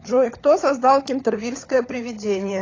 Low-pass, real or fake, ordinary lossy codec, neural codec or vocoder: 7.2 kHz; fake; AAC, 32 kbps; codec, 16 kHz, 16 kbps, FunCodec, trained on LibriTTS, 50 frames a second